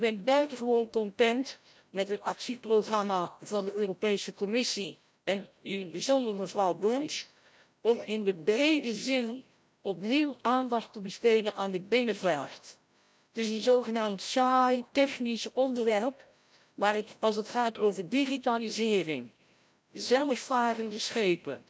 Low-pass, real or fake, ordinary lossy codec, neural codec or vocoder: none; fake; none; codec, 16 kHz, 0.5 kbps, FreqCodec, larger model